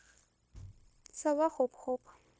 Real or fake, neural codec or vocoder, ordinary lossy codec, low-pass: fake; codec, 16 kHz, 0.9 kbps, LongCat-Audio-Codec; none; none